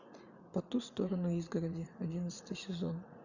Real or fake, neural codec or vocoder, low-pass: fake; vocoder, 44.1 kHz, 80 mel bands, Vocos; 7.2 kHz